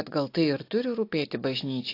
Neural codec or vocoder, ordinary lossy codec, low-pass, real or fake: none; AAC, 32 kbps; 5.4 kHz; real